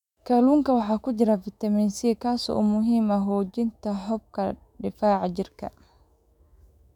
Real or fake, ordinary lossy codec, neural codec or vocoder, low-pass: fake; none; autoencoder, 48 kHz, 128 numbers a frame, DAC-VAE, trained on Japanese speech; 19.8 kHz